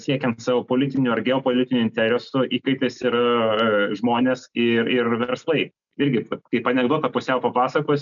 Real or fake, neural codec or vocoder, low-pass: real; none; 7.2 kHz